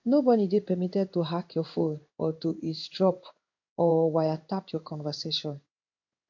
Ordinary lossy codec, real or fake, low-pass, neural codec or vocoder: AAC, 48 kbps; fake; 7.2 kHz; codec, 16 kHz in and 24 kHz out, 1 kbps, XY-Tokenizer